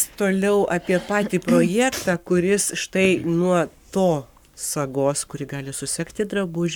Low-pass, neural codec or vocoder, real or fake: 19.8 kHz; codec, 44.1 kHz, 7.8 kbps, Pupu-Codec; fake